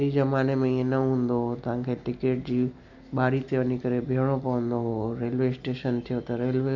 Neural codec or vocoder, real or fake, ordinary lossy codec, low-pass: none; real; none; 7.2 kHz